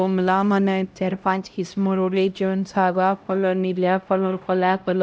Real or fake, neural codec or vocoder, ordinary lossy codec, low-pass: fake; codec, 16 kHz, 0.5 kbps, X-Codec, HuBERT features, trained on LibriSpeech; none; none